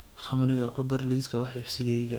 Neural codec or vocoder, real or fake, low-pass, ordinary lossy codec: codec, 44.1 kHz, 2.6 kbps, DAC; fake; none; none